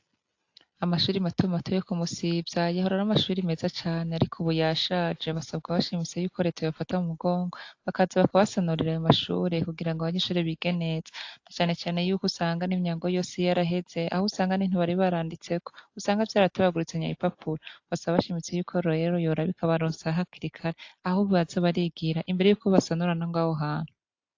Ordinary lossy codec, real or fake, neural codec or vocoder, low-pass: AAC, 48 kbps; real; none; 7.2 kHz